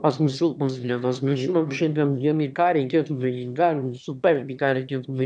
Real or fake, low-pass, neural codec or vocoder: fake; 9.9 kHz; autoencoder, 22.05 kHz, a latent of 192 numbers a frame, VITS, trained on one speaker